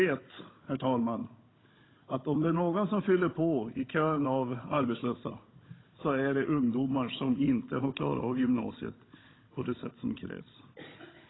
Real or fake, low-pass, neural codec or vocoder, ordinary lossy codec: fake; 7.2 kHz; codec, 16 kHz, 16 kbps, FunCodec, trained on Chinese and English, 50 frames a second; AAC, 16 kbps